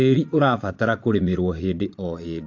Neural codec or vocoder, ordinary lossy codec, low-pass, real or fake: vocoder, 22.05 kHz, 80 mel bands, Vocos; AAC, 48 kbps; 7.2 kHz; fake